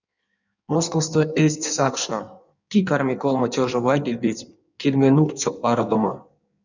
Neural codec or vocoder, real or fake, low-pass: codec, 16 kHz in and 24 kHz out, 1.1 kbps, FireRedTTS-2 codec; fake; 7.2 kHz